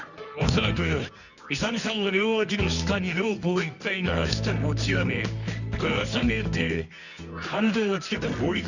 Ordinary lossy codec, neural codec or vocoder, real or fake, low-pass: none; codec, 24 kHz, 0.9 kbps, WavTokenizer, medium music audio release; fake; 7.2 kHz